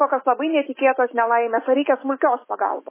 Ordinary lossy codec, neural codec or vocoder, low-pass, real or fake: MP3, 16 kbps; autoencoder, 48 kHz, 128 numbers a frame, DAC-VAE, trained on Japanese speech; 3.6 kHz; fake